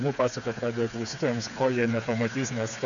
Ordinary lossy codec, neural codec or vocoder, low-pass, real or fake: MP3, 96 kbps; codec, 16 kHz, 4 kbps, FreqCodec, smaller model; 7.2 kHz; fake